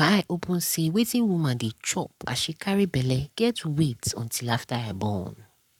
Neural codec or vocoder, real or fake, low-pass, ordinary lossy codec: codec, 44.1 kHz, 7.8 kbps, Pupu-Codec; fake; 19.8 kHz; none